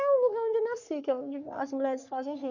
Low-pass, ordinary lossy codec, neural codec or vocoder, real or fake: 7.2 kHz; none; codec, 44.1 kHz, 3.4 kbps, Pupu-Codec; fake